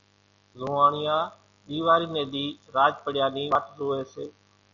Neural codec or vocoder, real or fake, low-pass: none; real; 7.2 kHz